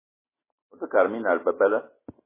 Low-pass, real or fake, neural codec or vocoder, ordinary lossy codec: 3.6 kHz; real; none; MP3, 16 kbps